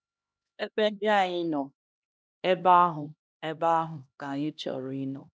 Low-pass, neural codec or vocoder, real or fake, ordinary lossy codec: none; codec, 16 kHz, 1 kbps, X-Codec, HuBERT features, trained on LibriSpeech; fake; none